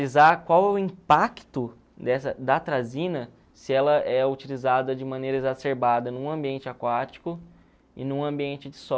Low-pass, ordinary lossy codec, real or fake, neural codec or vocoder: none; none; real; none